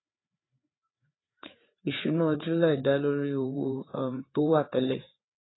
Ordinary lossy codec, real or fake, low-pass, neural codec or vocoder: AAC, 16 kbps; fake; 7.2 kHz; codec, 16 kHz, 4 kbps, FreqCodec, larger model